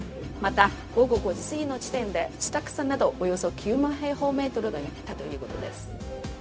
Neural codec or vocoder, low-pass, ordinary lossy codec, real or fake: codec, 16 kHz, 0.4 kbps, LongCat-Audio-Codec; none; none; fake